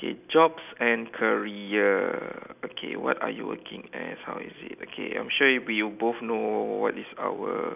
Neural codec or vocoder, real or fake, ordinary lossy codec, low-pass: none; real; none; 3.6 kHz